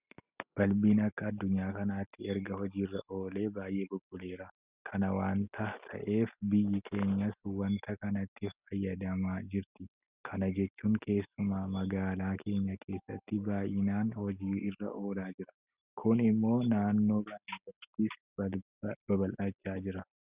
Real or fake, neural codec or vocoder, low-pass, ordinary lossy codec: real; none; 3.6 kHz; Opus, 64 kbps